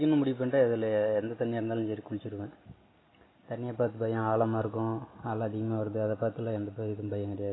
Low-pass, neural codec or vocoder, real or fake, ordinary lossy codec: 7.2 kHz; none; real; AAC, 16 kbps